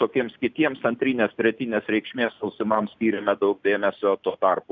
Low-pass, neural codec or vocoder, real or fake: 7.2 kHz; none; real